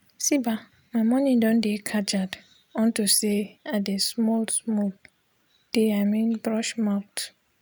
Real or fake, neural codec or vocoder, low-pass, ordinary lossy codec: real; none; none; none